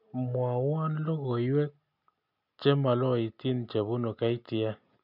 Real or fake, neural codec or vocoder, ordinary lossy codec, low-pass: real; none; none; 5.4 kHz